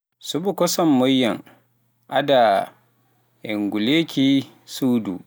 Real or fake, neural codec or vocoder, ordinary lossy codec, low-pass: real; none; none; none